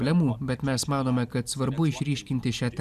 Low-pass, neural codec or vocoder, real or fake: 14.4 kHz; none; real